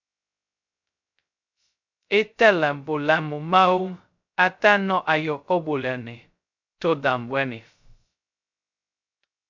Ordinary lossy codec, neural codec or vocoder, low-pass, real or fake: MP3, 64 kbps; codec, 16 kHz, 0.2 kbps, FocalCodec; 7.2 kHz; fake